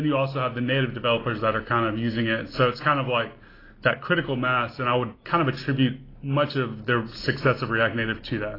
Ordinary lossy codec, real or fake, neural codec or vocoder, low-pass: AAC, 24 kbps; real; none; 5.4 kHz